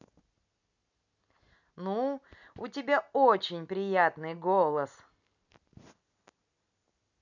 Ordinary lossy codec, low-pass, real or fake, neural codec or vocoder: none; 7.2 kHz; real; none